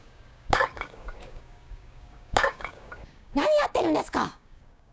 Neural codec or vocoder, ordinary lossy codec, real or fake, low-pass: codec, 16 kHz, 6 kbps, DAC; none; fake; none